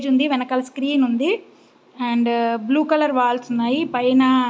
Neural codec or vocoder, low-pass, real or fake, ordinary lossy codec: none; none; real; none